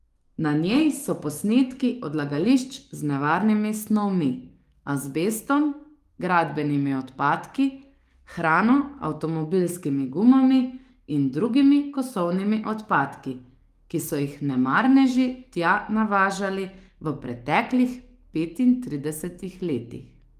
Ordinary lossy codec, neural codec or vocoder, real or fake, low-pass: Opus, 32 kbps; codec, 44.1 kHz, 7.8 kbps, DAC; fake; 14.4 kHz